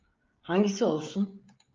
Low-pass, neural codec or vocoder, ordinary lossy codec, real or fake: 7.2 kHz; codec, 16 kHz, 16 kbps, FreqCodec, smaller model; Opus, 24 kbps; fake